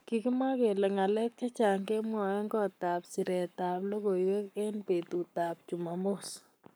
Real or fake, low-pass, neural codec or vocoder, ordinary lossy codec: fake; none; codec, 44.1 kHz, 7.8 kbps, Pupu-Codec; none